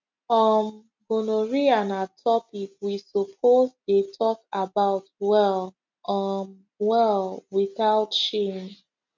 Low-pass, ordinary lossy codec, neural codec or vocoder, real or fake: 7.2 kHz; MP3, 48 kbps; none; real